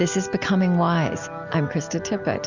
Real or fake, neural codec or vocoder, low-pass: real; none; 7.2 kHz